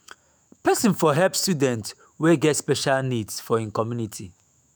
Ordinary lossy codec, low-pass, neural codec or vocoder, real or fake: none; none; autoencoder, 48 kHz, 128 numbers a frame, DAC-VAE, trained on Japanese speech; fake